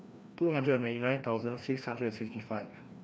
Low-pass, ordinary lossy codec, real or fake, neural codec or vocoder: none; none; fake; codec, 16 kHz, 1 kbps, FreqCodec, larger model